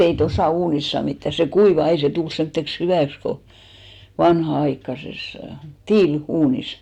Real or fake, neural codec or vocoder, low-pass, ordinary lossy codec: real; none; 19.8 kHz; none